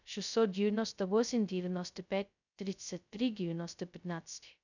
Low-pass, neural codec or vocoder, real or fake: 7.2 kHz; codec, 16 kHz, 0.2 kbps, FocalCodec; fake